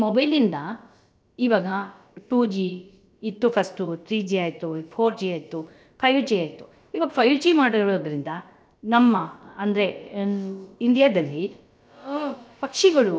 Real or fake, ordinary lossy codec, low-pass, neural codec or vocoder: fake; none; none; codec, 16 kHz, about 1 kbps, DyCAST, with the encoder's durations